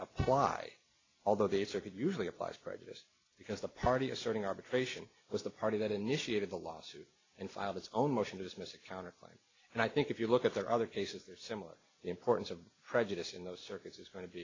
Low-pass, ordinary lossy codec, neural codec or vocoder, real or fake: 7.2 kHz; AAC, 32 kbps; none; real